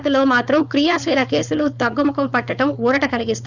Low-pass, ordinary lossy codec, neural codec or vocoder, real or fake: 7.2 kHz; none; codec, 16 kHz, 4.8 kbps, FACodec; fake